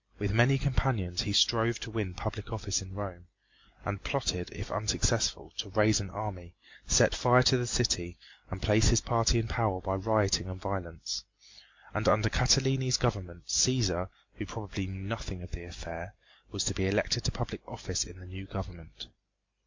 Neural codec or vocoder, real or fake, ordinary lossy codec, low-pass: none; real; MP3, 48 kbps; 7.2 kHz